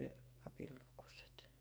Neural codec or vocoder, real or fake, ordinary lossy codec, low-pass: codec, 44.1 kHz, 2.6 kbps, SNAC; fake; none; none